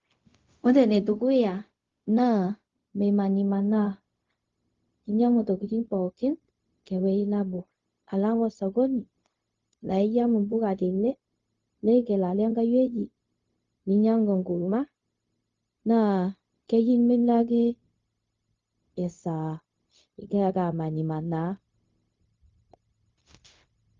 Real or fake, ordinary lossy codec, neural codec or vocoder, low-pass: fake; Opus, 32 kbps; codec, 16 kHz, 0.4 kbps, LongCat-Audio-Codec; 7.2 kHz